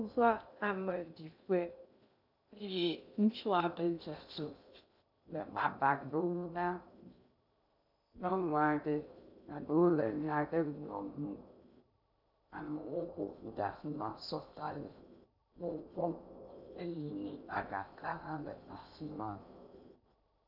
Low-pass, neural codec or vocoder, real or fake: 5.4 kHz; codec, 16 kHz in and 24 kHz out, 0.6 kbps, FocalCodec, streaming, 2048 codes; fake